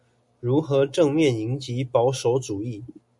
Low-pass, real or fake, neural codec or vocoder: 10.8 kHz; real; none